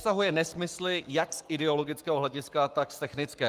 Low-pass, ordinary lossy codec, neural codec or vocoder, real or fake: 14.4 kHz; Opus, 32 kbps; codec, 44.1 kHz, 7.8 kbps, Pupu-Codec; fake